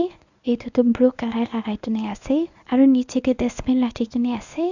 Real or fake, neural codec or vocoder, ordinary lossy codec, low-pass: fake; codec, 24 kHz, 0.9 kbps, WavTokenizer, medium speech release version 1; none; 7.2 kHz